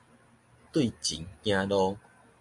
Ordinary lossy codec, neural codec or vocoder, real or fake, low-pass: MP3, 64 kbps; none; real; 10.8 kHz